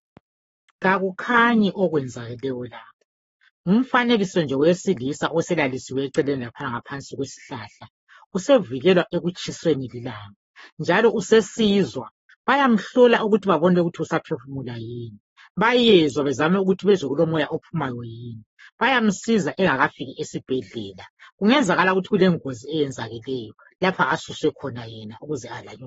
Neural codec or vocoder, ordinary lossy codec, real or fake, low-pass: codec, 44.1 kHz, 7.8 kbps, Pupu-Codec; AAC, 24 kbps; fake; 19.8 kHz